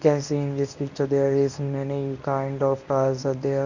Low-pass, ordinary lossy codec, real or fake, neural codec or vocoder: 7.2 kHz; none; fake; codec, 24 kHz, 0.9 kbps, WavTokenizer, medium speech release version 1